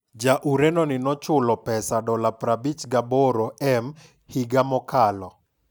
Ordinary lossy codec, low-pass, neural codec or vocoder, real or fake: none; none; none; real